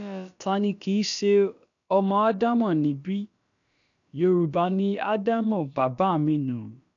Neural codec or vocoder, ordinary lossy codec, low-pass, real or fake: codec, 16 kHz, about 1 kbps, DyCAST, with the encoder's durations; none; 7.2 kHz; fake